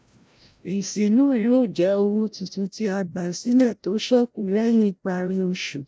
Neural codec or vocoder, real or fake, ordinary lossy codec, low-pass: codec, 16 kHz, 0.5 kbps, FreqCodec, larger model; fake; none; none